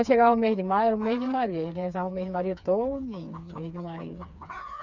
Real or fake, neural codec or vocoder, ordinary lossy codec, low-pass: fake; codec, 16 kHz, 4 kbps, FreqCodec, smaller model; none; 7.2 kHz